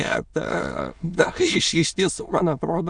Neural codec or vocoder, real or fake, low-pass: autoencoder, 22.05 kHz, a latent of 192 numbers a frame, VITS, trained on many speakers; fake; 9.9 kHz